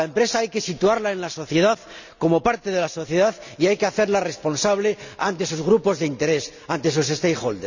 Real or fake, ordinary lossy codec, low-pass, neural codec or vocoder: real; none; 7.2 kHz; none